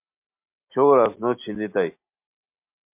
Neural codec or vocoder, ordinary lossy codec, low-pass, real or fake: none; MP3, 32 kbps; 3.6 kHz; real